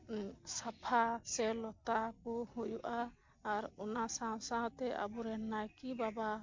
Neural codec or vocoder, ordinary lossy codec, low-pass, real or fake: none; MP3, 48 kbps; 7.2 kHz; real